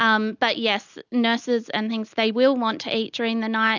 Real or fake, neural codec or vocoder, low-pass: real; none; 7.2 kHz